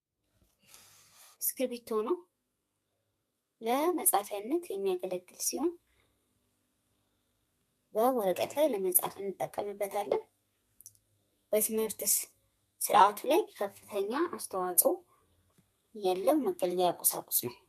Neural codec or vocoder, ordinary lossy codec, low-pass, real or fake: codec, 32 kHz, 1.9 kbps, SNAC; MP3, 96 kbps; 14.4 kHz; fake